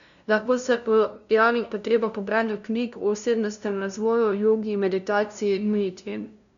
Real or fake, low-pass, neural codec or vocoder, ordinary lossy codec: fake; 7.2 kHz; codec, 16 kHz, 0.5 kbps, FunCodec, trained on LibriTTS, 25 frames a second; none